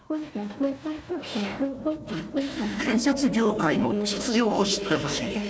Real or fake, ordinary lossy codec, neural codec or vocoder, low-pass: fake; none; codec, 16 kHz, 1 kbps, FunCodec, trained on Chinese and English, 50 frames a second; none